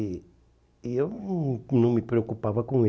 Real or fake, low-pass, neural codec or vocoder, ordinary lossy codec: real; none; none; none